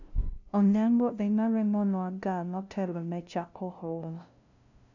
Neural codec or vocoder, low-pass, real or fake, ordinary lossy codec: codec, 16 kHz, 0.5 kbps, FunCodec, trained on LibriTTS, 25 frames a second; 7.2 kHz; fake; none